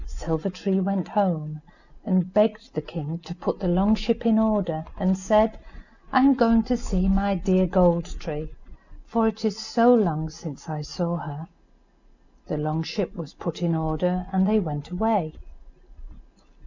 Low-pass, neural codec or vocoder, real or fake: 7.2 kHz; none; real